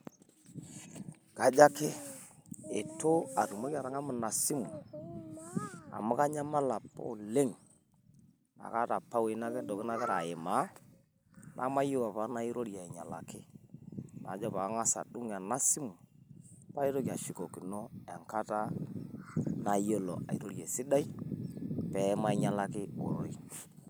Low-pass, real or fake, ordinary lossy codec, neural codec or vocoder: none; real; none; none